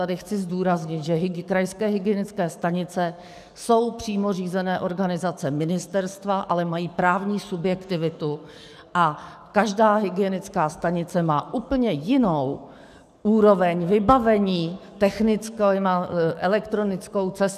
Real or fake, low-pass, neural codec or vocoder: fake; 14.4 kHz; codec, 44.1 kHz, 7.8 kbps, DAC